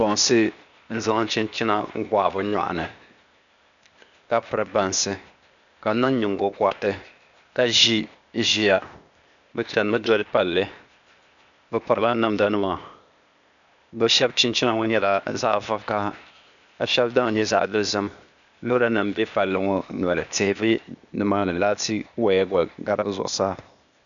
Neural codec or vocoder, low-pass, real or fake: codec, 16 kHz, 0.8 kbps, ZipCodec; 7.2 kHz; fake